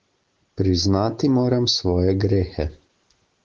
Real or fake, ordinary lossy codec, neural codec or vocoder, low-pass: real; Opus, 16 kbps; none; 7.2 kHz